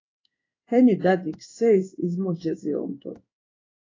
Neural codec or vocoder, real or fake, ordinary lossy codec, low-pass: codec, 16 kHz in and 24 kHz out, 1 kbps, XY-Tokenizer; fake; AAC, 32 kbps; 7.2 kHz